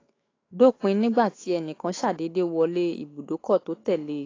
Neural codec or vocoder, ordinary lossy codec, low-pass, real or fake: autoencoder, 48 kHz, 128 numbers a frame, DAC-VAE, trained on Japanese speech; AAC, 32 kbps; 7.2 kHz; fake